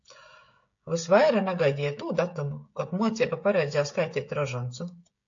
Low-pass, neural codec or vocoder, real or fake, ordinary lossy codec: 7.2 kHz; codec, 16 kHz, 16 kbps, FreqCodec, smaller model; fake; AAC, 48 kbps